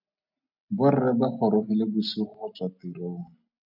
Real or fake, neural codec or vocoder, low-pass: real; none; 5.4 kHz